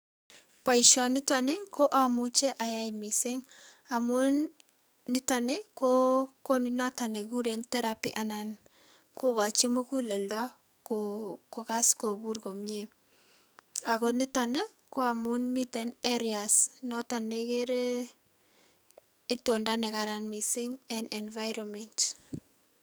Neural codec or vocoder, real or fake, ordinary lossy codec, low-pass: codec, 44.1 kHz, 2.6 kbps, SNAC; fake; none; none